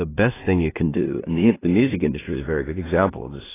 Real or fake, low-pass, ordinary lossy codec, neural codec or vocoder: fake; 3.6 kHz; AAC, 16 kbps; codec, 16 kHz in and 24 kHz out, 0.4 kbps, LongCat-Audio-Codec, two codebook decoder